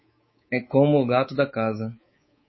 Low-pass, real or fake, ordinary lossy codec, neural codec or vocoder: 7.2 kHz; fake; MP3, 24 kbps; codec, 24 kHz, 3.1 kbps, DualCodec